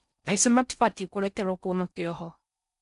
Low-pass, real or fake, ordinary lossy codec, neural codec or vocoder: 10.8 kHz; fake; none; codec, 16 kHz in and 24 kHz out, 0.6 kbps, FocalCodec, streaming, 4096 codes